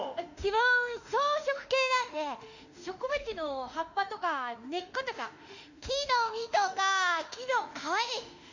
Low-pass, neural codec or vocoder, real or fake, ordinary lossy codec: 7.2 kHz; autoencoder, 48 kHz, 32 numbers a frame, DAC-VAE, trained on Japanese speech; fake; none